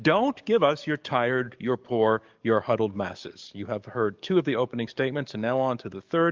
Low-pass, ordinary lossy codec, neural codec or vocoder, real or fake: 7.2 kHz; Opus, 24 kbps; codec, 44.1 kHz, 7.8 kbps, DAC; fake